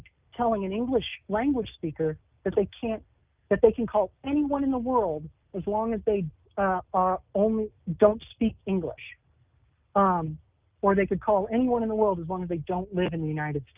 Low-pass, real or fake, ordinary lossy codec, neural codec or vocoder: 3.6 kHz; real; Opus, 32 kbps; none